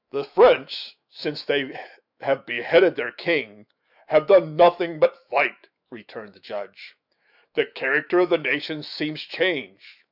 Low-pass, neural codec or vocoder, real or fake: 5.4 kHz; autoencoder, 48 kHz, 128 numbers a frame, DAC-VAE, trained on Japanese speech; fake